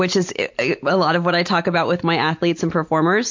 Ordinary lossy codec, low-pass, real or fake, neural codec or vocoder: MP3, 48 kbps; 7.2 kHz; real; none